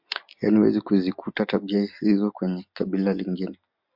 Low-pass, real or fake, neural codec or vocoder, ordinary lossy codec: 5.4 kHz; real; none; MP3, 48 kbps